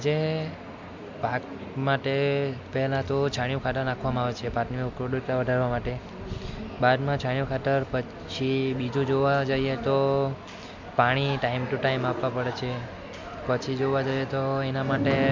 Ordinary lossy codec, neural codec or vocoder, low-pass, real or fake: MP3, 64 kbps; none; 7.2 kHz; real